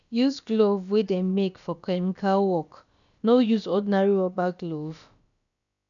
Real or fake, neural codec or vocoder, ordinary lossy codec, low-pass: fake; codec, 16 kHz, about 1 kbps, DyCAST, with the encoder's durations; none; 7.2 kHz